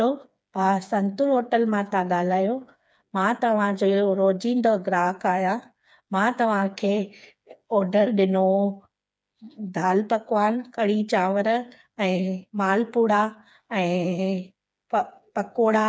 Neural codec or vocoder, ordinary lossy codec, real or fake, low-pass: codec, 16 kHz, 4 kbps, FreqCodec, smaller model; none; fake; none